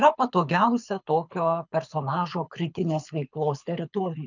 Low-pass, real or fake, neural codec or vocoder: 7.2 kHz; fake; codec, 24 kHz, 6 kbps, HILCodec